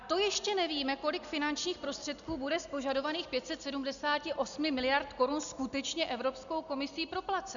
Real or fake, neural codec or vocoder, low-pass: real; none; 7.2 kHz